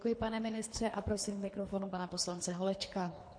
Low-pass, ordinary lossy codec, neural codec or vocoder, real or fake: 9.9 kHz; MP3, 48 kbps; codec, 24 kHz, 3 kbps, HILCodec; fake